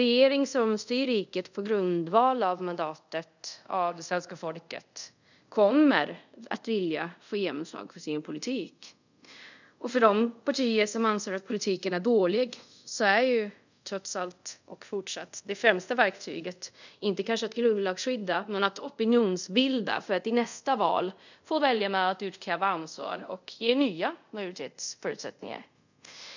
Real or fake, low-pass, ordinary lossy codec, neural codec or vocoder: fake; 7.2 kHz; none; codec, 24 kHz, 0.5 kbps, DualCodec